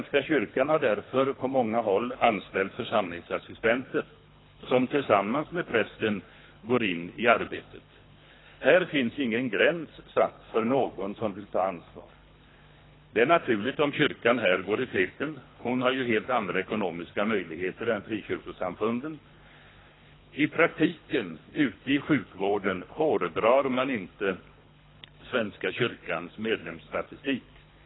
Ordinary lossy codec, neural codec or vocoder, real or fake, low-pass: AAC, 16 kbps; codec, 24 kHz, 3 kbps, HILCodec; fake; 7.2 kHz